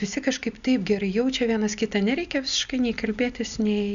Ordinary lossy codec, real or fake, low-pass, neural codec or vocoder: Opus, 64 kbps; real; 7.2 kHz; none